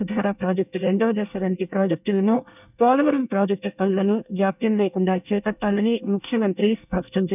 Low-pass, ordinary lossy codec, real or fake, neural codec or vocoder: 3.6 kHz; none; fake; codec, 24 kHz, 1 kbps, SNAC